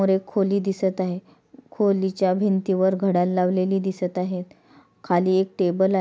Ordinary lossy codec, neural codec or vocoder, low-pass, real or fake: none; none; none; real